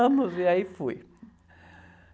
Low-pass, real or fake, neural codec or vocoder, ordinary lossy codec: none; real; none; none